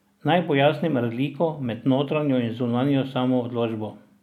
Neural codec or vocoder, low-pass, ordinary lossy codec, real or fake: none; 19.8 kHz; none; real